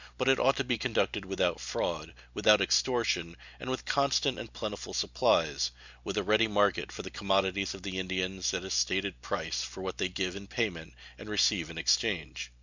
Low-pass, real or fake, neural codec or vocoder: 7.2 kHz; real; none